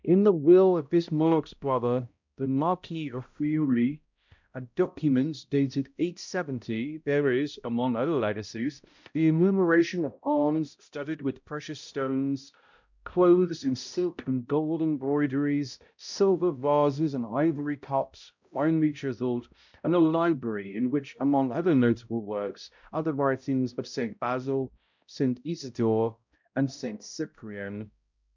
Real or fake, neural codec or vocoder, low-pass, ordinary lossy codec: fake; codec, 16 kHz, 0.5 kbps, X-Codec, HuBERT features, trained on balanced general audio; 7.2 kHz; MP3, 64 kbps